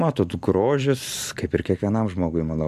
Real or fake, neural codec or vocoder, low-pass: real; none; 14.4 kHz